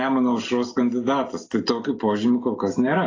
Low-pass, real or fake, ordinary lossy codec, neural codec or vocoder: 7.2 kHz; real; AAC, 32 kbps; none